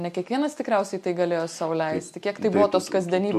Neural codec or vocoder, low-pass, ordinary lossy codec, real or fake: none; 14.4 kHz; MP3, 64 kbps; real